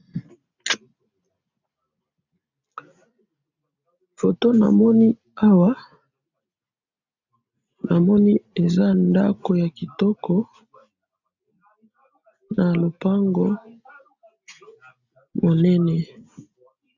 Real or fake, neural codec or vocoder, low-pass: real; none; 7.2 kHz